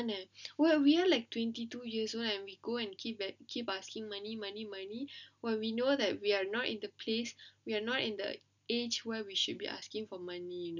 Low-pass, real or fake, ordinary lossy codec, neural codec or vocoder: 7.2 kHz; real; none; none